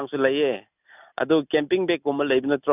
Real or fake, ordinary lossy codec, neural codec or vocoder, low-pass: real; none; none; 3.6 kHz